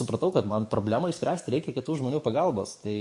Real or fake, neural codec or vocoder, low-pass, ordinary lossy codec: fake; codec, 44.1 kHz, 7.8 kbps, DAC; 10.8 kHz; MP3, 48 kbps